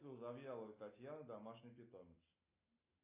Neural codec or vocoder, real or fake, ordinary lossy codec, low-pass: none; real; AAC, 24 kbps; 3.6 kHz